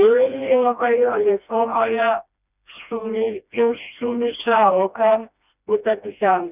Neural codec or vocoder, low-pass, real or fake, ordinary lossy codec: codec, 16 kHz, 1 kbps, FreqCodec, smaller model; 3.6 kHz; fake; none